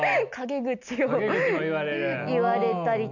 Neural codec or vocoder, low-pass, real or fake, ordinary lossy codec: none; 7.2 kHz; real; none